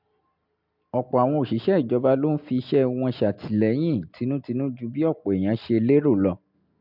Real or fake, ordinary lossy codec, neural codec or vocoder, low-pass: real; MP3, 48 kbps; none; 5.4 kHz